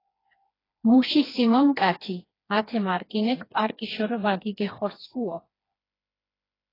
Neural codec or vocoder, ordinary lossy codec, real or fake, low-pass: codec, 16 kHz, 2 kbps, FreqCodec, smaller model; AAC, 24 kbps; fake; 5.4 kHz